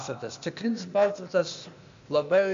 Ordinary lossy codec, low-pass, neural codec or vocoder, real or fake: AAC, 64 kbps; 7.2 kHz; codec, 16 kHz, 0.8 kbps, ZipCodec; fake